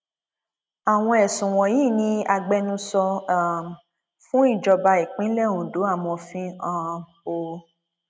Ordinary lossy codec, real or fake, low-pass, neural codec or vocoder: none; real; none; none